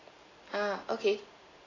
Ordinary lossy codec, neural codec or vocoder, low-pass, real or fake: AAC, 32 kbps; none; 7.2 kHz; real